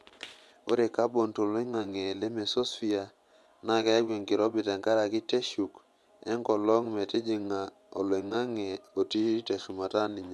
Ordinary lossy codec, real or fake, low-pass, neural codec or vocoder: none; fake; none; vocoder, 24 kHz, 100 mel bands, Vocos